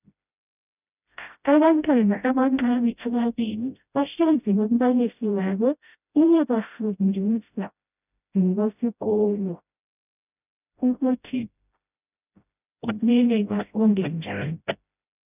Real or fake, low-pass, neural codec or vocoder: fake; 3.6 kHz; codec, 16 kHz, 0.5 kbps, FreqCodec, smaller model